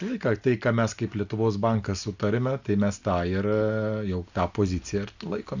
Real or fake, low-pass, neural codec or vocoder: real; 7.2 kHz; none